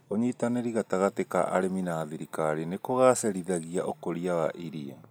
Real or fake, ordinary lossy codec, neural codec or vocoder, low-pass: real; none; none; none